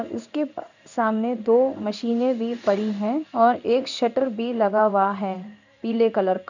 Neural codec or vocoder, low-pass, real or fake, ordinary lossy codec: codec, 16 kHz in and 24 kHz out, 1 kbps, XY-Tokenizer; 7.2 kHz; fake; none